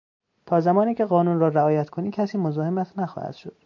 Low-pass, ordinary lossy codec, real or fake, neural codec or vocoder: 7.2 kHz; MP3, 48 kbps; real; none